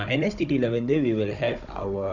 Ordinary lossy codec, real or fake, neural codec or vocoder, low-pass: none; fake; codec, 16 kHz, 16 kbps, FunCodec, trained on Chinese and English, 50 frames a second; 7.2 kHz